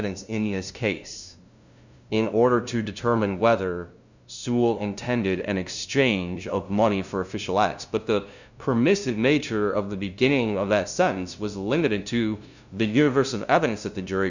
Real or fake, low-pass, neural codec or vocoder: fake; 7.2 kHz; codec, 16 kHz, 0.5 kbps, FunCodec, trained on LibriTTS, 25 frames a second